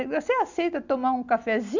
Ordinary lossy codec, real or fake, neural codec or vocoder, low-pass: none; real; none; 7.2 kHz